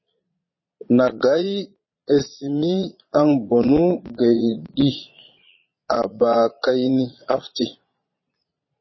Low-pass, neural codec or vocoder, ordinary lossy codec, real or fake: 7.2 kHz; vocoder, 22.05 kHz, 80 mel bands, Vocos; MP3, 24 kbps; fake